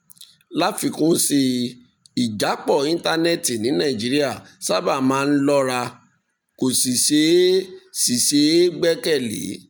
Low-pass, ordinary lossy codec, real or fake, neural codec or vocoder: none; none; real; none